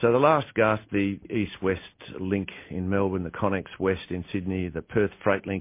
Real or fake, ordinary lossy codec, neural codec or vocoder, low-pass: real; MP3, 24 kbps; none; 3.6 kHz